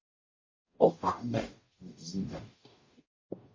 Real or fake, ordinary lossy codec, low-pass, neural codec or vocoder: fake; MP3, 32 kbps; 7.2 kHz; codec, 44.1 kHz, 0.9 kbps, DAC